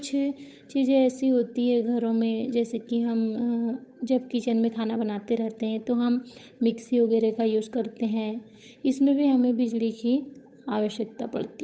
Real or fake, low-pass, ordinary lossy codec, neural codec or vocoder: fake; none; none; codec, 16 kHz, 8 kbps, FunCodec, trained on Chinese and English, 25 frames a second